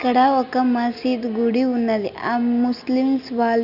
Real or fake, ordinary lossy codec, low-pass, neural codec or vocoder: real; none; 5.4 kHz; none